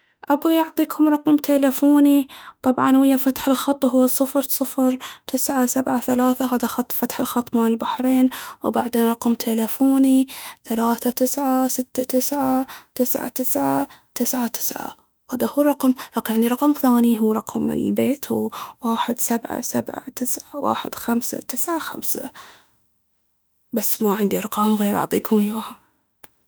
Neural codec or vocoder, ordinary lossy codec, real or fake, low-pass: autoencoder, 48 kHz, 32 numbers a frame, DAC-VAE, trained on Japanese speech; none; fake; none